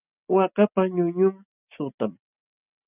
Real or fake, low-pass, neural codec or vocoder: real; 3.6 kHz; none